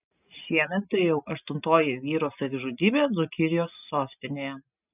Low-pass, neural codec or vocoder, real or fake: 3.6 kHz; none; real